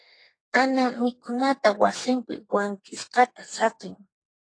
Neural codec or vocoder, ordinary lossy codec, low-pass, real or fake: codec, 32 kHz, 1.9 kbps, SNAC; AAC, 32 kbps; 9.9 kHz; fake